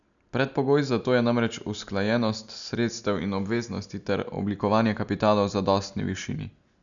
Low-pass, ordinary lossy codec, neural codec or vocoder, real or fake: 7.2 kHz; none; none; real